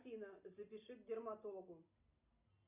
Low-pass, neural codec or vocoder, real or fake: 3.6 kHz; none; real